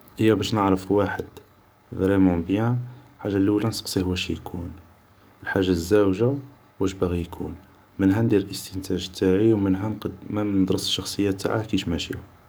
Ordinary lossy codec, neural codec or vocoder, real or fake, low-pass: none; codec, 44.1 kHz, 7.8 kbps, DAC; fake; none